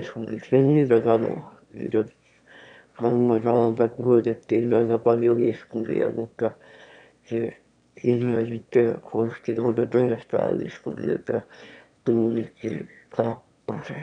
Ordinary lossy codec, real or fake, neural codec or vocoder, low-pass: none; fake; autoencoder, 22.05 kHz, a latent of 192 numbers a frame, VITS, trained on one speaker; 9.9 kHz